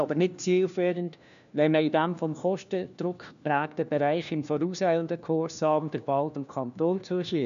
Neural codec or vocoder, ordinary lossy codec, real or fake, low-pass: codec, 16 kHz, 1 kbps, FunCodec, trained on LibriTTS, 50 frames a second; none; fake; 7.2 kHz